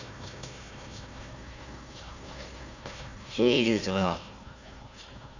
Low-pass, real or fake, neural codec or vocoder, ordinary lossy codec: 7.2 kHz; fake; codec, 16 kHz, 1 kbps, FunCodec, trained on Chinese and English, 50 frames a second; none